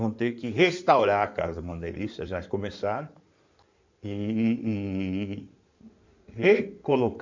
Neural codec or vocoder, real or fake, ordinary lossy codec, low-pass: codec, 16 kHz in and 24 kHz out, 2.2 kbps, FireRedTTS-2 codec; fake; MP3, 64 kbps; 7.2 kHz